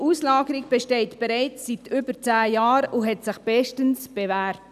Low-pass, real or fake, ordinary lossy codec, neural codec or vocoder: 14.4 kHz; real; none; none